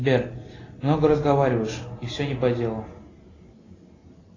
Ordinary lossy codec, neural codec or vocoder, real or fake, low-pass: AAC, 32 kbps; none; real; 7.2 kHz